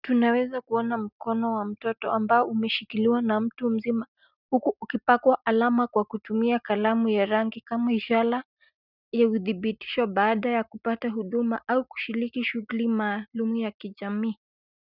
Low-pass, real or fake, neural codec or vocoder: 5.4 kHz; real; none